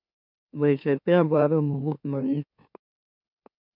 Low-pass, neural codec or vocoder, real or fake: 5.4 kHz; autoencoder, 44.1 kHz, a latent of 192 numbers a frame, MeloTTS; fake